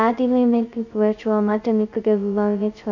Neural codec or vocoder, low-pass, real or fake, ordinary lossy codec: codec, 16 kHz, 0.2 kbps, FocalCodec; 7.2 kHz; fake; none